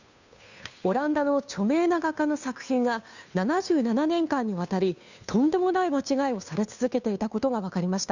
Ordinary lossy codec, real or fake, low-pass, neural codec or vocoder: none; fake; 7.2 kHz; codec, 16 kHz, 2 kbps, FunCodec, trained on Chinese and English, 25 frames a second